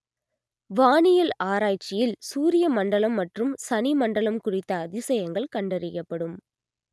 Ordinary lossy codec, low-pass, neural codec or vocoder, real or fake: none; none; none; real